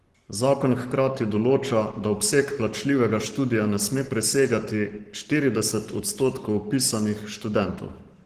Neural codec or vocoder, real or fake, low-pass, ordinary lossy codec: codec, 44.1 kHz, 7.8 kbps, Pupu-Codec; fake; 14.4 kHz; Opus, 16 kbps